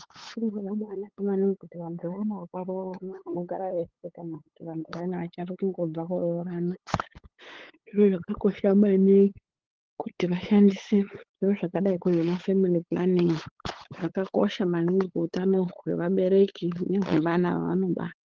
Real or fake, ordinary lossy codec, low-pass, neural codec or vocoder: fake; Opus, 16 kbps; 7.2 kHz; codec, 16 kHz, 8 kbps, FunCodec, trained on LibriTTS, 25 frames a second